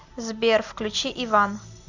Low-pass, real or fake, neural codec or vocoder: 7.2 kHz; real; none